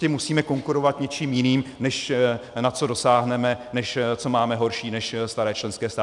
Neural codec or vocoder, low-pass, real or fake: none; 10.8 kHz; real